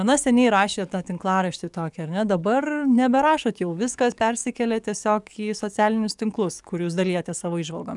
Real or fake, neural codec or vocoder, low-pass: fake; codec, 44.1 kHz, 7.8 kbps, DAC; 10.8 kHz